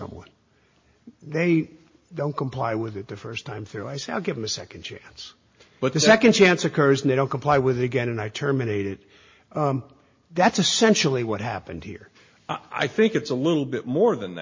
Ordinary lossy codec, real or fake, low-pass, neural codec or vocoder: MP3, 32 kbps; real; 7.2 kHz; none